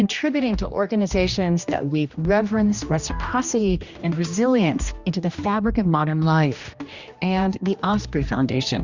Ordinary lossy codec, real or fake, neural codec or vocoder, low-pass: Opus, 64 kbps; fake; codec, 16 kHz, 1 kbps, X-Codec, HuBERT features, trained on general audio; 7.2 kHz